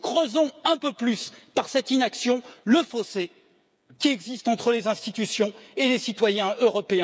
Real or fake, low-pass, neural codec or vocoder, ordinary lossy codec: fake; none; codec, 16 kHz, 8 kbps, FreqCodec, smaller model; none